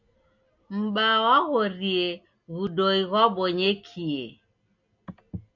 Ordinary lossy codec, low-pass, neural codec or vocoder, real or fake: MP3, 64 kbps; 7.2 kHz; none; real